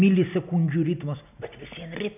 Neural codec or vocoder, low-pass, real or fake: none; 3.6 kHz; real